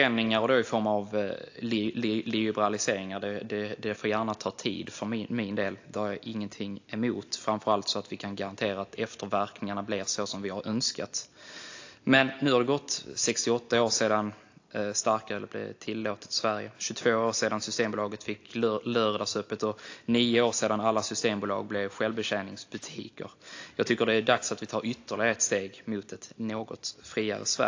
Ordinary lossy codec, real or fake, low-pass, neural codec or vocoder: AAC, 48 kbps; real; 7.2 kHz; none